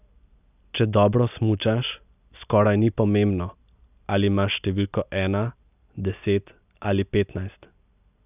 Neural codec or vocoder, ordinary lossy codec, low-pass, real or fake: none; none; 3.6 kHz; real